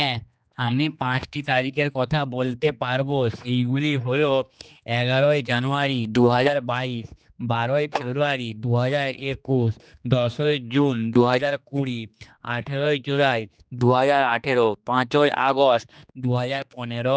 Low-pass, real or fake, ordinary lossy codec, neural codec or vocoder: none; fake; none; codec, 16 kHz, 2 kbps, X-Codec, HuBERT features, trained on general audio